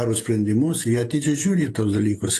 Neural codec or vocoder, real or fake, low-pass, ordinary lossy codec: vocoder, 48 kHz, 128 mel bands, Vocos; fake; 14.4 kHz; AAC, 48 kbps